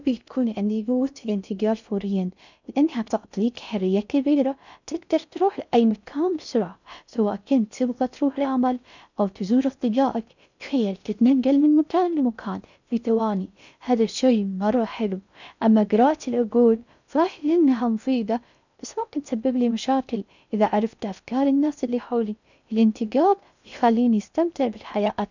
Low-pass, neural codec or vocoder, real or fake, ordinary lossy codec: 7.2 kHz; codec, 16 kHz in and 24 kHz out, 0.6 kbps, FocalCodec, streaming, 2048 codes; fake; none